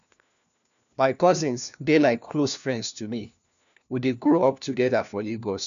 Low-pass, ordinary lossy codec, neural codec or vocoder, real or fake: 7.2 kHz; none; codec, 16 kHz, 1 kbps, FunCodec, trained on LibriTTS, 50 frames a second; fake